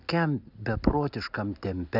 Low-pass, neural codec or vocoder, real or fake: 5.4 kHz; none; real